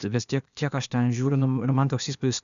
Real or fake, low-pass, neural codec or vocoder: fake; 7.2 kHz; codec, 16 kHz, 0.8 kbps, ZipCodec